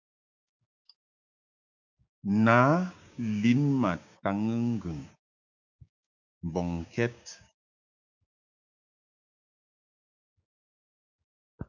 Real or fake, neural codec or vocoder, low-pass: fake; codec, 16 kHz, 6 kbps, DAC; 7.2 kHz